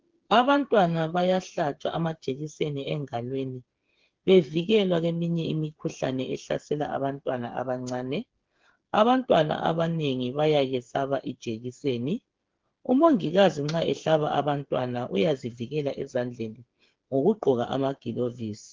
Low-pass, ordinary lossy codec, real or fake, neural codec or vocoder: 7.2 kHz; Opus, 16 kbps; fake; codec, 16 kHz, 8 kbps, FreqCodec, smaller model